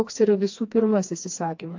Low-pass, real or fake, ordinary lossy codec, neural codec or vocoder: 7.2 kHz; fake; AAC, 48 kbps; codec, 16 kHz, 2 kbps, FreqCodec, smaller model